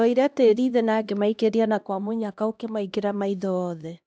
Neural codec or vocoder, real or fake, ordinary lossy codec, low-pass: codec, 16 kHz, 1 kbps, X-Codec, HuBERT features, trained on LibriSpeech; fake; none; none